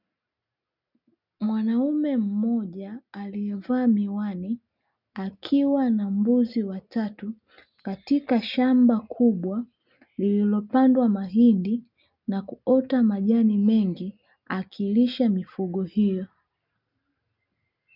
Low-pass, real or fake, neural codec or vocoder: 5.4 kHz; real; none